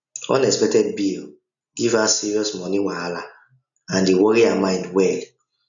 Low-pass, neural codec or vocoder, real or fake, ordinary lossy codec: 7.2 kHz; none; real; none